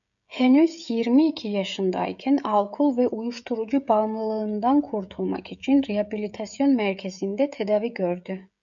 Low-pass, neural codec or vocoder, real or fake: 7.2 kHz; codec, 16 kHz, 16 kbps, FreqCodec, smaller model; fake